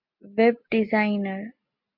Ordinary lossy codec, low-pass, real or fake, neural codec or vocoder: MP3, 48 kbps; 5.4 kHz; real; none